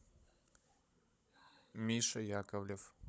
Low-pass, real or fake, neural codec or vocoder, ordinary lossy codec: none; fake; codec, 16 kHz, 16 kbps, FunCodec, trained on Chinese and English, 50 frames a second; none